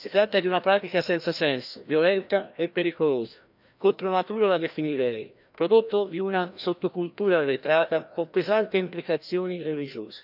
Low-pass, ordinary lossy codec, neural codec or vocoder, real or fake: 5.4 kHz; none; codec, 16 kHz, 1 kbps, FreqCodec, larger model; fake